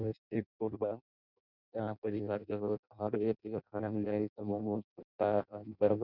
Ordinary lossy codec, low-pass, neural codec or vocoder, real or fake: none; 5.4 kHz; codec, 16 kHz in and 24 kHz out, 0.6 kbps, FireRedTTS-2 codec; fake